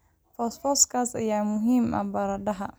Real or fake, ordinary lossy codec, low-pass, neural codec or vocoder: real; none; none; none